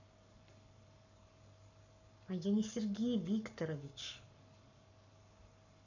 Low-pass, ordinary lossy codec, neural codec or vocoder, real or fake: 7.2 kHz; none; codec, 44.1 kHz, 7.8 kbps, Pupu-Codec; fake